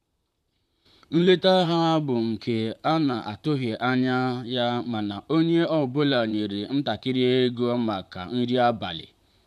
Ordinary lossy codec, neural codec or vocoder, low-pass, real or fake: none; vocoder, 44.1 kHz, 128 mel bands, Pupu-Vocoder; 14.4 kHz; fake